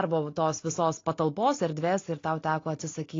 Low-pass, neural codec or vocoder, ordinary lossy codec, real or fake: 7.2 kHz; none; AAC, 32 kbps; real